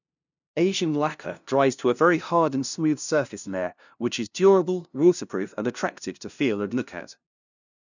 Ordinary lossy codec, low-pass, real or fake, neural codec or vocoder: none; 7.2 kHz; fake; codec, 16 kHz, 0.5 kbps, FunCodec, trained on LibriTTS, 25 frames a second